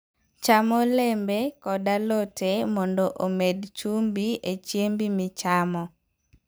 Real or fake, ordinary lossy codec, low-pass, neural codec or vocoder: fake; none; none; vocoder, 44.1 kHz, 128 mel bands every 256 samples, BigVGAN v2